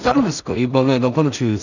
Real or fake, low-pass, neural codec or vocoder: fake; 7.2 kHz; codec, 16 kHz in and 24 kHz out, 0.4 kbps, LongCat-Audio-Codec, two codebook decoder